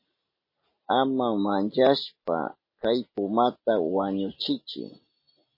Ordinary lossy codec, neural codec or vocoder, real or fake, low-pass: MP3, 24 kbps; none; real; 5.4 kHz